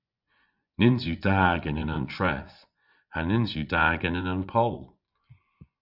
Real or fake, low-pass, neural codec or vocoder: fake; 5.4 kHz; vocoder, 24 kHz, 100 mel bands, Vocos